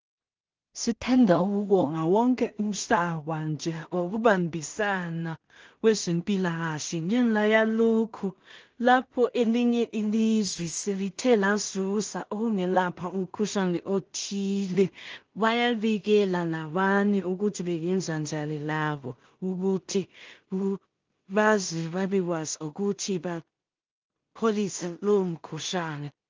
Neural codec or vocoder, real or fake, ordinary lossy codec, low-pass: codec, 16 kHz in and 24 kHz out, 0.4 kbps, LongCat-Audio-Codec, two codebook decoder; fake; Opus, 24 kbps; 7.2 kHz